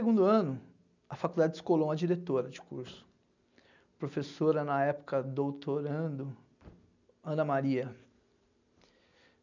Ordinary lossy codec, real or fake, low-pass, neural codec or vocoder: none; real; 7.2 kHz; none